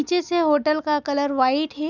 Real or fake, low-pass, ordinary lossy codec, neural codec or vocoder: real; 7.2 kHz; none; none